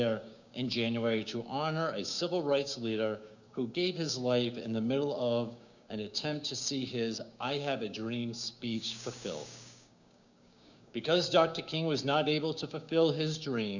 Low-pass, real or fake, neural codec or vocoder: 7.2 kHz; fake; codec, 16 kHz, 6 kbps, DAC